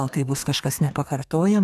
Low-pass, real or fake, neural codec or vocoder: 14.4 kHz; fake; codec, 32 kHz, 1.9 kbps, SNAC